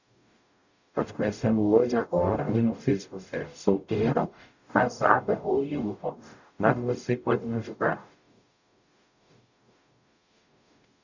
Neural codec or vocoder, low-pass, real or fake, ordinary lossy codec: codec, 44.1 kHz, 0.9 kbps, DAC; 7.2 kHz; fake; none